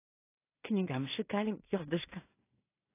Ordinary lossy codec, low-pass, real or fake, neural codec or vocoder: AAC, 24 kbps; 3.6 kHz; fake; codec, 16 kHz in and 24 kHz out, 0.4 kbps, LongCat-Audio-Codec, two codebook decoder